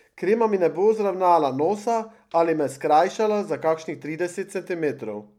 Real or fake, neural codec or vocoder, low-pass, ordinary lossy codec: real; none; 19.8 kHz; MP3, 96 kbps